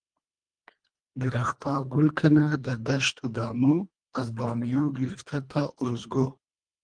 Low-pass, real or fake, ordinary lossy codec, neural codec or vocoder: 9.9 kHz; fake; Opus, 32 kbps; codec, 24 kHz, 1.5 kbps, HILCodec